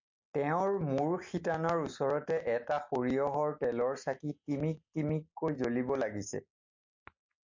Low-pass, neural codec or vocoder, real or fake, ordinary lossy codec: 7.2 kHz; none; real; MP3, 48 kbps